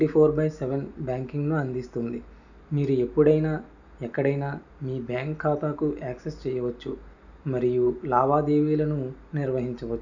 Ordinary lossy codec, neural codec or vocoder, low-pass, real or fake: none; none; 7.2 kHz; real